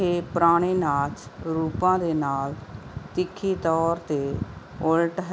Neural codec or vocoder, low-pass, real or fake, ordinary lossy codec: none; none; real; none